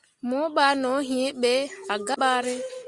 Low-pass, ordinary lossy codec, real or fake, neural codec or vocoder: 10.8 kHz; Opus, 64 kbps; real; none